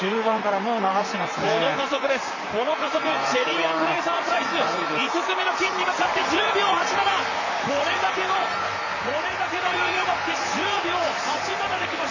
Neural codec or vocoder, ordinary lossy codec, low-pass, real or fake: vocoder, 44.1 kHz, 128 mel bands, Pupu-Vocoder; none; 7.2 kHz; fake